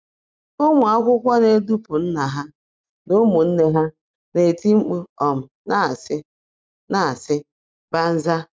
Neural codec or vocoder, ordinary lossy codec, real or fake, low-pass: none; none; real; none